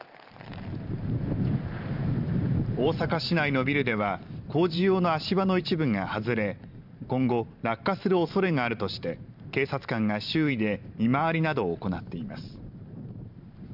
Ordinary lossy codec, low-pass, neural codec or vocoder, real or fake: none; 5.4 kHz; vocoder, 44.1 kHz, 128 mel bands every 512 samples, BigVGAN v2; fake